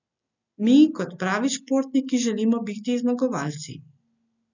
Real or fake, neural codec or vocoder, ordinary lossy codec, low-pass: real; none; none; 7.2 kHz